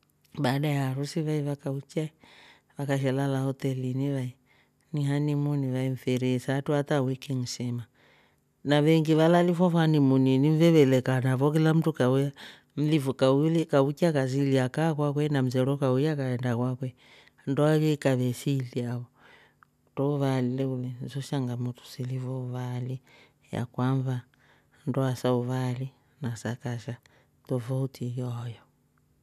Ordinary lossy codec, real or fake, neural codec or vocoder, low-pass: none; real; none; 14.4 kHz